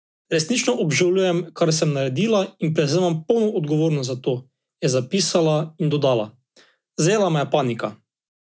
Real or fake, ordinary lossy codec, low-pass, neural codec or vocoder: real; none; none; none